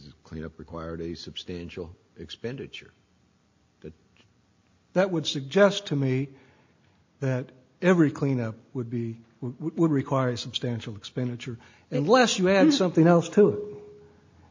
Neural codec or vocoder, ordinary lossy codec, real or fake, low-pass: none; MP3, 48 kbps; real; 7.2 kHz